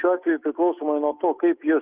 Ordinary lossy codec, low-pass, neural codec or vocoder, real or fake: Opus, 32 kbps; 3.6 kHz; none; real